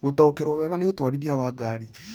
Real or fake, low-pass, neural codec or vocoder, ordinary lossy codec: fake; none; codec, 44.1 kHz, 2.6 kbps, DAC; none